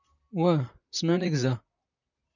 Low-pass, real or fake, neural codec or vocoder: 7.2 kHz; fake; vocoder, 44.1 kHz, 128 mel bands, Pupu-Vocoder